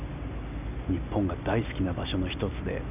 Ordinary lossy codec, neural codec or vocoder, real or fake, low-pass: none; none; real; 3.6 kHz